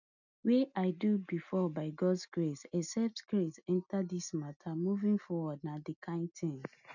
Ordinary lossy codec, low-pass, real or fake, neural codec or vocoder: none; 7.2 kHz; real; none